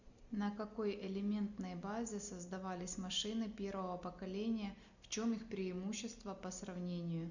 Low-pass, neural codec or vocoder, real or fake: 7.2 kHz; none; real